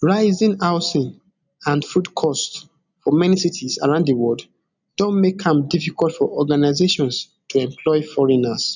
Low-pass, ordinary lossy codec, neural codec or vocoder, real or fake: 7.2 kHz; none; none; real